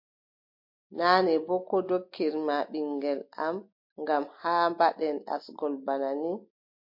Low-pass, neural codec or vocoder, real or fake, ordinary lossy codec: 5.4 kHz; none; real; MP3, 32 kbps